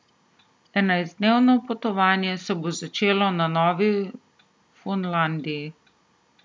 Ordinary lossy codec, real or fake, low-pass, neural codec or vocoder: none; real; none; none